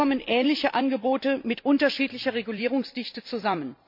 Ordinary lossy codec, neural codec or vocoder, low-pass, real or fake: MP3, 48 kbps; vocoder, 44.1 kHz, 128 mel bands every 256 samples, BigVGAN v2; 5.4 kHz; fake